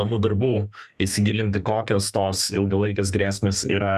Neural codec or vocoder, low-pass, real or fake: codec, 32 kHz, 1.9 kbps, SNAC; 14.4 kHz; fake